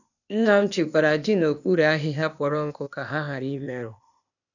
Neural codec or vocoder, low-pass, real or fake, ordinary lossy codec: codec, 16 kHz, 0.8 kbps, ZipCodec; 7.2 kHz; fake; none